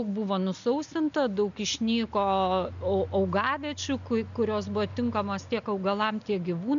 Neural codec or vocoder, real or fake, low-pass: none; real; 7.2 kHz